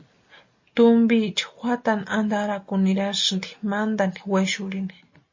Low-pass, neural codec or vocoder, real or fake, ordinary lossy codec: 7.2 kHz; none; real; MP3, 32 kbps